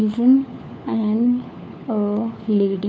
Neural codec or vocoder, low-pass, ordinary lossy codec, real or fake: codec, 16 kHz, 4 kbps, FunCodec, trained on LibriTTS, 50 frames a second; none; none; fake